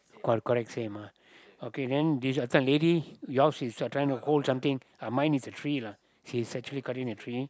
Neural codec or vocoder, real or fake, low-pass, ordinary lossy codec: none; real; none; none